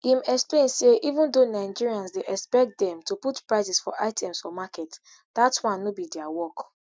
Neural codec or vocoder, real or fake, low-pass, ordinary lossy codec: none; real; none; none